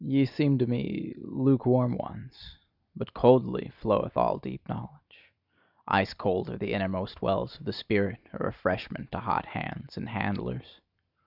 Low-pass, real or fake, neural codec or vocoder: 5.4 kHz; real; none